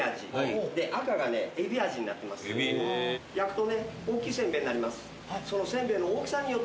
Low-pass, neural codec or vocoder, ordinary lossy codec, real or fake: none; none; none; real